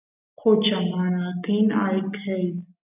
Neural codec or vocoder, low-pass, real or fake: none; 3.6 kHz; real